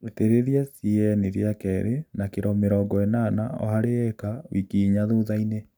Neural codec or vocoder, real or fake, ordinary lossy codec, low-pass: none; real; none; none